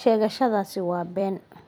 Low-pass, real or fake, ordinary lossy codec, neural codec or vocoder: none; fake; none; vocoder, 44.1 kHz, 128 mel bands every 256 samples, BigVGAN v2